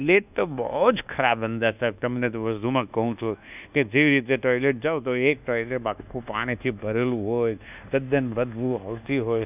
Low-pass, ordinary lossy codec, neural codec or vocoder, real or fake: 3.6 kHz; none; codec, 24 kHz, 1.2 kbps, DualCodec; fake